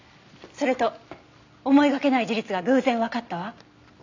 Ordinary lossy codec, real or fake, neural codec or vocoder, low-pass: AAC, 48 kbps; real; none; 7.2 kHz